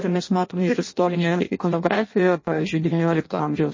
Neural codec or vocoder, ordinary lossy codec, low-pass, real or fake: codec, 16 kHz in and 24 kHz out, 0.6 kbps, FireRedTTS-2 codec; MP3, 32 kbps; 7.2 kHz; fake